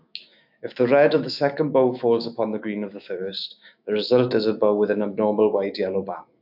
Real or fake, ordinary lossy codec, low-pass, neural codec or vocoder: fake; AAC, 48 kbps; 5.4 kHz; autoencoder, 48 kHz, 128 numbers a frame, DAC-VAE, trained on Japanese speech